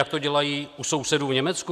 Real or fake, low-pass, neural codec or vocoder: real; 14.4 kHz; none